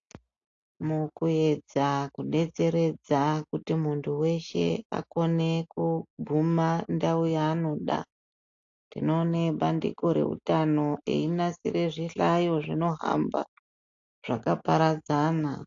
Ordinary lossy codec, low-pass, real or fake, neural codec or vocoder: AAC, 48 kbps; 7.2 kHz; real; none